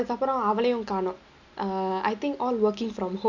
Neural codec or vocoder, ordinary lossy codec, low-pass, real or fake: none; none; 7.2 kHz; real